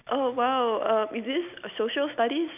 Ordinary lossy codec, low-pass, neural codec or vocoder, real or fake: none; 3.6 kHz; none; real